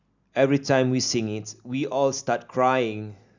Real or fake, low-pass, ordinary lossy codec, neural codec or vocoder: real; 7.2 kHz; none; none